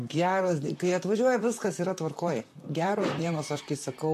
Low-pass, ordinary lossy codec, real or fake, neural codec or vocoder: 14.4 kHz; MP3, 64 kbps; fake; vocoder, 44.1 kHz, 128 mel bands, Pupu-Vocoder